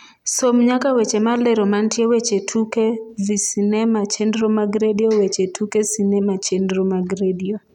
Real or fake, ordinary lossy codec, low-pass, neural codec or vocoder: real; none; 19.8 kHz; none